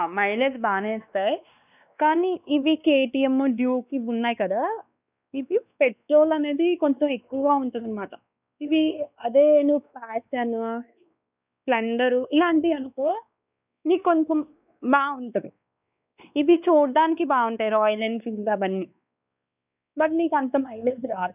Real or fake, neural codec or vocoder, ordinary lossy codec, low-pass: fake; codec, 16 kHz, 2 kbps, X-Codec, WavLM features, trained on Multilingual LibriSpeech; none; 3.6 kHz